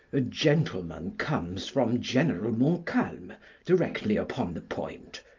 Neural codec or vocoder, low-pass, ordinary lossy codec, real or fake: none; 7.2 kHz; Opus, 24 kbps; real